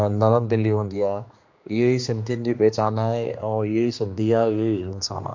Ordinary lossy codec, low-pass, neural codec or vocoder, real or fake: MP3, 48 kbps; 7.2 kHz; codec, 16 kHz, 2 kbps, X-Codec, HuBERT features, trained on general audio; fake